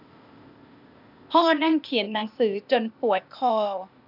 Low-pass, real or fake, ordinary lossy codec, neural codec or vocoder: 5.4 kHz; fake; none; codec, 16 kHz, 0.8 kbps, ZipCodec